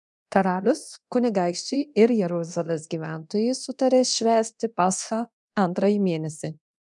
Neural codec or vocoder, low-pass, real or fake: codec, 24 kHz, 0.9 kbps, DualCodec; 10.8 kHz; fake